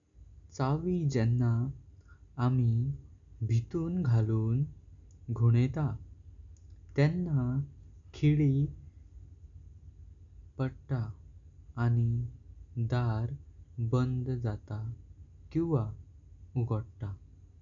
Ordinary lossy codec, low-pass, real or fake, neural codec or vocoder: none; 7.2 kHz; real; none